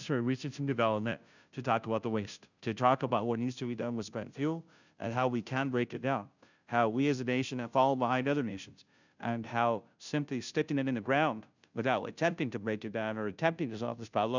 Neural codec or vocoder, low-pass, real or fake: codec, 16 kHz, 0.5 kbps, FunCodec, trained on Chinese and English, 25 frames a second; 7.2 kHz; fake